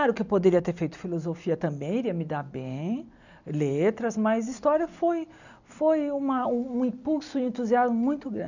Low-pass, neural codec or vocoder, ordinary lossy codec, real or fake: 7.2 kHz; none; none; real